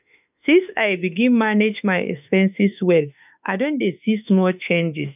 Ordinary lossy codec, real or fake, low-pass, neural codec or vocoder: none; fake; 3.6 kHz; autoencoder, 48 kHz, 32 numbers a frame, DAC-VAE, trained on Japanese speech